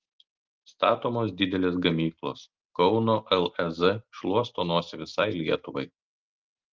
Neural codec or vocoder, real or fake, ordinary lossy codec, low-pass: none; real; Opus, 24 kbps; 7.2 kHz